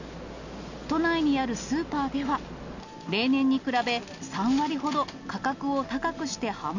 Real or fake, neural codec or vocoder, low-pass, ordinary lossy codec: real; none; 7.2 kHz; none